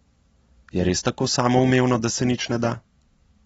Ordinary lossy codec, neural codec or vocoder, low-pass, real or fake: AAC, 24 kbps; none; 14.4 kHz; real